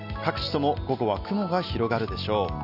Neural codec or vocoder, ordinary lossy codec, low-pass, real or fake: none; none; 5.4 kHz; real